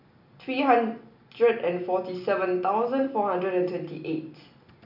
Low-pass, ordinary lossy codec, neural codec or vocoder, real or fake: 5.4 kHz; none; none; real